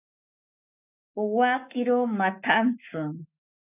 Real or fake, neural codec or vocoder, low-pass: fake; codec, 44.1 kHz, 7.8 kbps, Pupu-Codec; 3.6 kHz